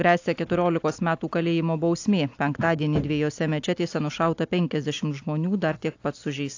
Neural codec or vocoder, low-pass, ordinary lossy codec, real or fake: none; 7.2 kHz; AAC, 48 kbps; real